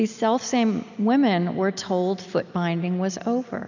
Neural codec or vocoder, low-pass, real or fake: none; 7.2 kHz; real